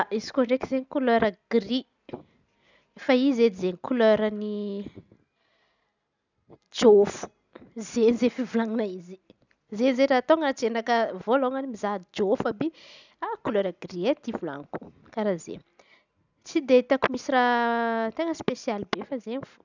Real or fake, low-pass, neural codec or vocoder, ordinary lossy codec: real; 7.2 kHz; none; none